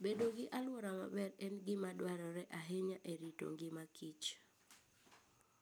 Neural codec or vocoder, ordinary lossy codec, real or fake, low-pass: none; none; real; none